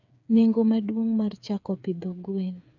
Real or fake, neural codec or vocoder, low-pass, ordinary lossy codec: fake; codec, 16 kHz, 8 kbps, FreqCodec, smaller model; 7.2 kHz; none